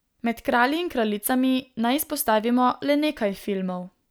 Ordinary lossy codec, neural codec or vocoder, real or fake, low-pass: none; none; real; none